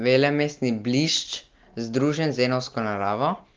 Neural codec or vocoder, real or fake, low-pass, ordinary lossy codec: none; real; 7.2 kHz; Opus, 32 kbps